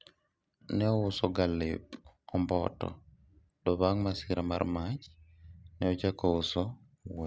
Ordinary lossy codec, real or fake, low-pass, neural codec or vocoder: none; real; none; none